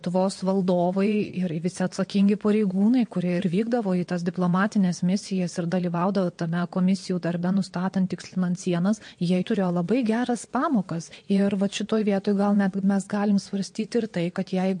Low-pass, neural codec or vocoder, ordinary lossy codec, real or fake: 9.9 kHz; vocoder, 22.05 kHz, 80 mel bands, WaveNeXt; MP3, 48 kbps; fake